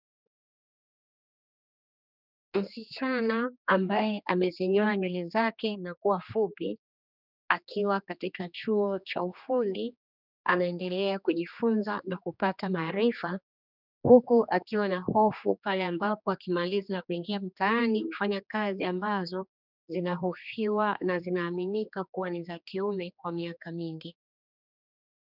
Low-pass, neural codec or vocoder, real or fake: 5.4 kHz; codec, 16 kHz, 2 kbps, X-Codec, HuBERT features, trained on general audio; fake